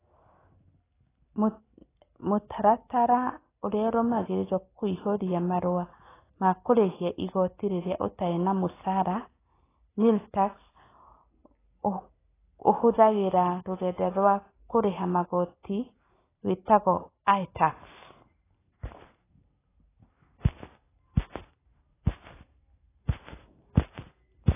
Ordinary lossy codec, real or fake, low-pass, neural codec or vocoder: AAC, 16 kbps; real; 3.6 kHz; none